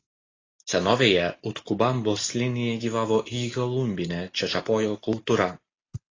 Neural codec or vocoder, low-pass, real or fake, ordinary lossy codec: none; 7.2 kHz; real; AAC, 32 kbps